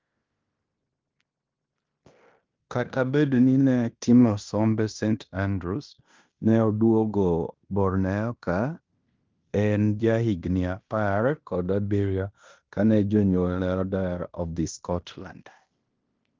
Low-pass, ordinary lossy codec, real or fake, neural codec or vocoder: 7.2 kHz; Opus, 32 kbps; fake; codec, 16 kHz in and 24 kHz out, 0.9 kbps, LongCat-Audio-Codec, fine tuned four codebook decoder